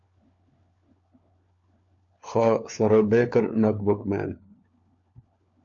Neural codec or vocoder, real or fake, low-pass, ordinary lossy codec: codec, 16 kHz, 4 kbps, FunCodec, trained on LibriTTS, 50 frames a second; fake; 7.2 kHz; AAC, 48 kbps